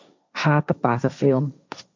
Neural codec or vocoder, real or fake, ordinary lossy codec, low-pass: codec, 16 kHz, 1.1 kbps, Voila-Tokenizer; fake; MP3, 64 kbps; 7.2 kHz